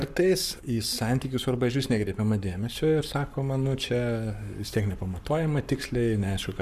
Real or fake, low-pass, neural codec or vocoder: fake; 14.4 kHz; codec, 44.1 kHz, 7.8 kbps, Pupu-Codec